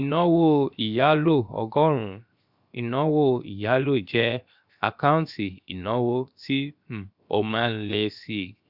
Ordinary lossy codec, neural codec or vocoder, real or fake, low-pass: Opus, 64 kbps; codec, 16 kHz, 0.7 kbps, FocalCodec; fake; 5.4 kHz